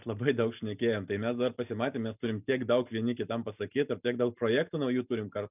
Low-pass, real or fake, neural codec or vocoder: 3.6 kHz; real; none